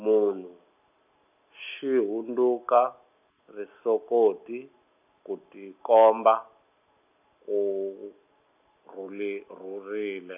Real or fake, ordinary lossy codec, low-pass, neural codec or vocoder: real; none; 3.6 kHz; none